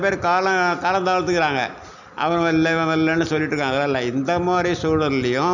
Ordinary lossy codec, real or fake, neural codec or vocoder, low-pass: none; real; none; 7.2 kHz